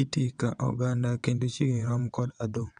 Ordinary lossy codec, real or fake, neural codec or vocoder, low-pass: none; fake; vocoder, 22.05 kHz, 80 mel bands, WaveNeXt; 9.9 kHz